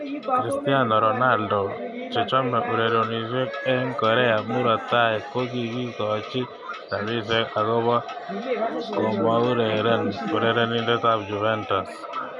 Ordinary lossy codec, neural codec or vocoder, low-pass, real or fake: none; none; 10.8 kHz; real